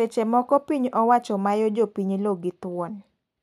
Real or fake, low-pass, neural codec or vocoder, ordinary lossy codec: real; 14.4 kHz; none; none